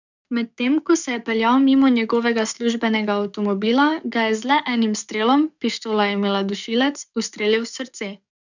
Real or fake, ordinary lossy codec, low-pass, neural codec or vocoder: fake; none; 7.2 kHz; codec, 44.1 kHz, 7.8 kbps, DAC